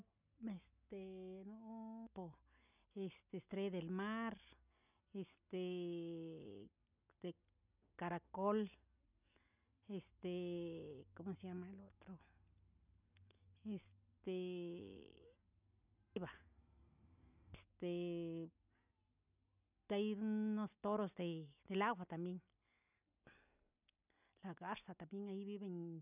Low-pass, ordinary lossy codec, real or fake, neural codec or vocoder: 3.6 kHz; none; real; none